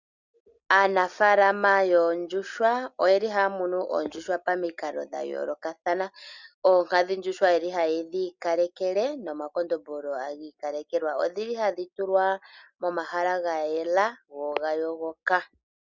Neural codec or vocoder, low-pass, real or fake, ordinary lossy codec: none; 7.2 kHz; real; Opus, 64 kbps